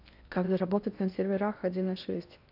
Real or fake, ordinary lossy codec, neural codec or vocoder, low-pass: fake; none; codec, 16 kHz in and 24 kHz out, 0.6 kbps, FocalCodec, streaming, 2048 codes; 5.4 kHz